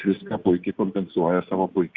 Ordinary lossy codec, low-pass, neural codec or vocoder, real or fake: Opus, 64 kbps; 7.2 kHz; none; real